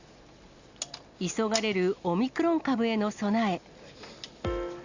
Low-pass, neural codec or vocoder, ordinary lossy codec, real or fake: 7.2 kHz; none; Opus, 64 kbps; real